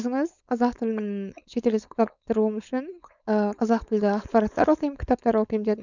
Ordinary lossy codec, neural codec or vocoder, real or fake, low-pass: none; codec, 16 kHz, 4.8 kbps, FACodec; fake; 7.2 kHz